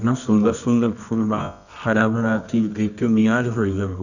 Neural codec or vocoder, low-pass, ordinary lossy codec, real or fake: codec, 24 kHz, 0.9 kbps, WavTokenizer, medium music audio release; 7.2 kHz; none; fake